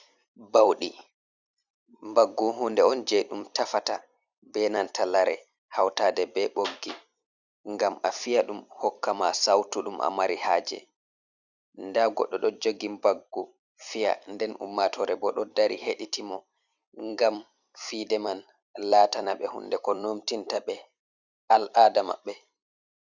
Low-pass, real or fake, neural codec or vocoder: 7.2 kHz; fake; vocoder, 44.1 kHz, 128 mel bands every 256 samples, BigVGAN v2